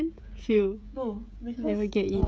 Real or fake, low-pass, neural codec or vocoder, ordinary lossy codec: fake; none; codec, 16 kHz, 16 kbps, FreqCodec, smaller model; none